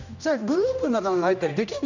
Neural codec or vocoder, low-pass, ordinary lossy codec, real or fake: codec, 16 kHz, 1 kbps, X-Codec, HuBERT features, trained on general audio; 7.2 kHz; none; fake